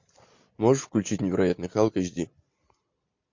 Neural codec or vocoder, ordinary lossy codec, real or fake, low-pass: none; MP3, 48 kbps; real; 7.2 kHz